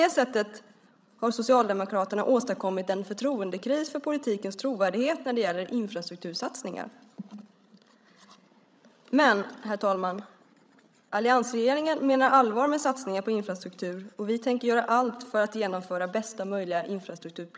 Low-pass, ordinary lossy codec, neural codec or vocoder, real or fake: none; none; codec, 16 kHz, 16 kbps, FreqCodec, larger model; fake